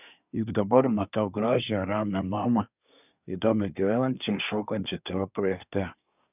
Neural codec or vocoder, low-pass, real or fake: codec, 24 kHz, 1 kbps, SNAC; 3.6 kHz; fake